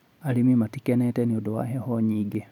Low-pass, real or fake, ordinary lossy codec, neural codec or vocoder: 19.8 kHz; real; none; none